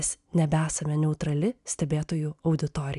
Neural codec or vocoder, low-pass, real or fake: none; 10.8 kHz; real